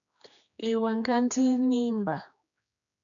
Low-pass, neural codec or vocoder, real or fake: 7.2 kHz; codec, 16 kHz, 2 kbps, X-Codec, HuBERT features, trained on general audio; fake